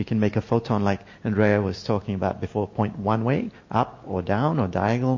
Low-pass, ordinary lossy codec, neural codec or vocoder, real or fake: 7.2 kHz; MP3, 32 kbps; none; real